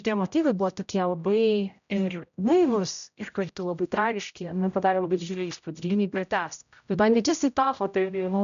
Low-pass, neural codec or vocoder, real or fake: 7.2 kHz; codec, 16 kHz, 0.5 kbps, X-Codec, HuBERT features, trained on general audio; fake